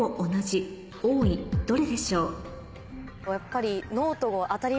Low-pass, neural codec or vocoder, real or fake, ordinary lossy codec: none; none; real; none